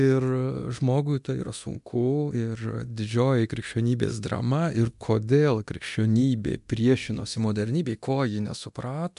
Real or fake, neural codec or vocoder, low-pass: fake; codec, 24 kHz, 0.9 kbps, DualCodec; 10.8 kHz